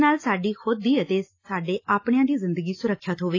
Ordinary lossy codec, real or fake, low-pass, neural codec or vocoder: AAC, 32 kbps; real; 7.2 kHz; none